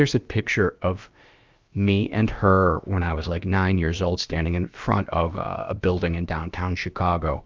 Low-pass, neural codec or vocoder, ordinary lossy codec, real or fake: 7.2 kHz; codec, 16 kHz, about 1 kbps, DyCAST, with the encoder's durations; Opus, 24 kbps; fake